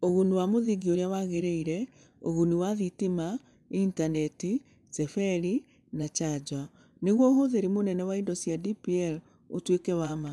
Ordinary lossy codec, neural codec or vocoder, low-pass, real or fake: none; vocoder, 24 kHz, 100 mel bands, Vocos; none; fake